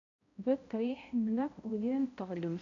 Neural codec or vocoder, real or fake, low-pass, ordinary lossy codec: codec, 16 kHz, 0.5 kbps, X-Codec, HuBERT features, trained on balanced general audio; fake; 7.2 kHz; AAC, 48 kbps